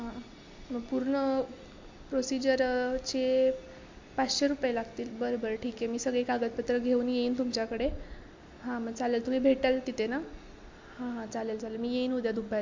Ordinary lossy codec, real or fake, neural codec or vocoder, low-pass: MP3, 48 kbps; real; none; 7.2 kHz